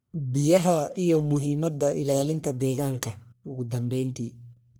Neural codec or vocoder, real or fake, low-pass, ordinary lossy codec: codec, 44.1 kHz, 1.7 kbps, Pupu-Codec; fake; none; none